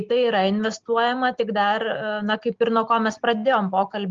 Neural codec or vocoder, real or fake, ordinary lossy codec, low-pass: none; real; Opus, 32 kbps; 7.2 kHz